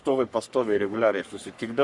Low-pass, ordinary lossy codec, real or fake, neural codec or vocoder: 10.8 kHz; Opus, 64 kbps; fake; codec, 44.1 kHz, 3.4 kbps, Pupu-Codec